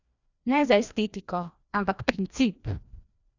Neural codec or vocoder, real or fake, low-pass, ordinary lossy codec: codec, 16 kHz, 1 kbps, FreqCodec, larger model; fake; 7.2 kHz; none